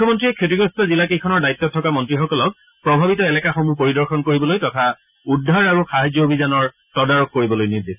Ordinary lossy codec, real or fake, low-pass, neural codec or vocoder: none; real; 3.6 kHz; none